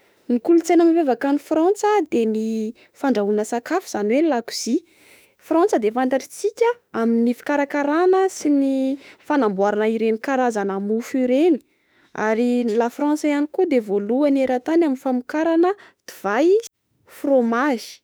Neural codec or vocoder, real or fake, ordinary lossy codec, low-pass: autoencoder, 48 kHz, 32 numbers a frame, DAC-VAE, trained on Japanese speech; fake; none; none